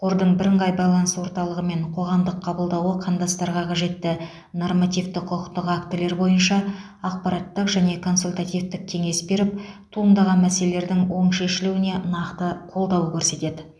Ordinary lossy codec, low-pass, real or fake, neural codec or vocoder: none; none; real; none